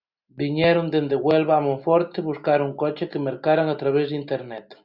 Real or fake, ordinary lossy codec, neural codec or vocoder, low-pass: real; Opus, 64 kbps; none; 5.4 kHz